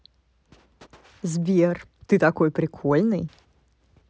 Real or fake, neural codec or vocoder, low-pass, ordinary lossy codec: real; none; none; none